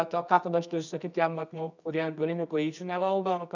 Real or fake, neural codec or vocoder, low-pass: fake; codec, 24 kHz, 0.9 kbps, WavTokenizer, medium music audio release; 7.2 kHz